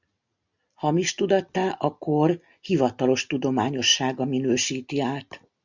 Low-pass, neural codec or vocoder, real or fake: 7.2 kHz; none; real